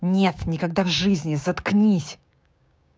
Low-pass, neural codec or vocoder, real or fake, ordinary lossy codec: none; codec, 16 kHz, 6 kbps, DAC; fake; none